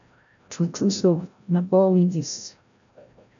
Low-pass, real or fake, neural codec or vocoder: 7.2 kHz; fake; codec, 16 kHz, 0.5 kbps, FreqCodec, larger model